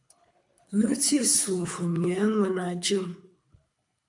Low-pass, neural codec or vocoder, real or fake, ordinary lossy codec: 10.8 kHz; codec, 24 kHz, 3 kbps, HILCodec; fake; MP3, 64 kbps